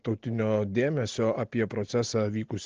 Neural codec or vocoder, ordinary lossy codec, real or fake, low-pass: none; Opus, 16 kbps; real; 7.2 kHz